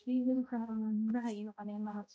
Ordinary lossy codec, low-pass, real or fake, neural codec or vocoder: none; none; fake; codec, 16 kHz, 0.5 kbps, X-Codec, HuBERT features, trained on balanced general audio